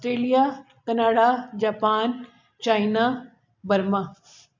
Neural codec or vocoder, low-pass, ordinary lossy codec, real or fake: none; 7.2 kHz; MP3, 64 kbps; real